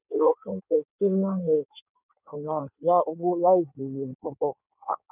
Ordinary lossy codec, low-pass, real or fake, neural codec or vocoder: none; 3.6 kHz; fake; codec, 16 kHz in and 24 kHz out, 1.1 kbps, FireRedTTS-2 codec